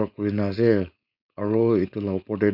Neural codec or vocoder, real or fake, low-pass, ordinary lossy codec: codec, 16 kHz, 4.8 kbps, FACodec; fake; 5.4 kHz; none